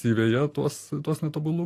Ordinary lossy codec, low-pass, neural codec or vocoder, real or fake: AAC, 48 kbps; 14.4 kHz; none; real